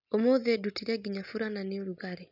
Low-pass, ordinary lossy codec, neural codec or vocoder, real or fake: 5.4 kHz; none; none; real